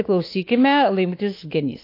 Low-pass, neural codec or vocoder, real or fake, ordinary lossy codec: 5.4 kHz; autoencoder, 48 kHz, 32 numbers a frame, DAC-VAE, trained on Japanese speech; fake; AAC, 32 kbps